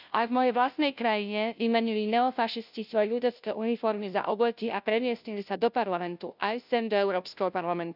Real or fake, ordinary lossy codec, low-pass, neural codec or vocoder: fake; none; 5.4 kHz; codec, 16 kHz, 0.5 kbps, FunCodec, trained on Chinese and English, 25 frames a second